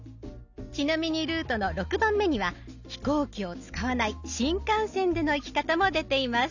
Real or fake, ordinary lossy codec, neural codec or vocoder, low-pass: real; none; none; 7.2 kHz